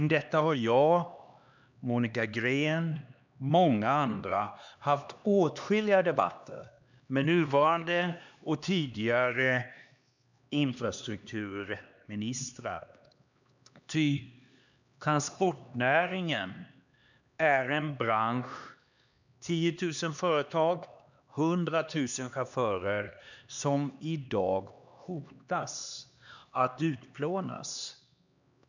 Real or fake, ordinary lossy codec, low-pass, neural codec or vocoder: fake; none; 7.2 kHz; codec, 16 kHz, 2 kbps, X-Codec, HuBERT features, trained on LibriSpeech